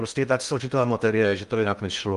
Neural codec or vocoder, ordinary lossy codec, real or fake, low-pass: codec, 16 kHz in and 24 kHz out, 0.8 kbps, FocalCodec, streaming, 65536 codes; Opus, 32 kbps; fake; 10.8 kHz